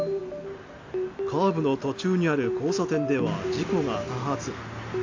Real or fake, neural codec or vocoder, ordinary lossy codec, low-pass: real; none; AAC, 48 kbps; 7.2 kHz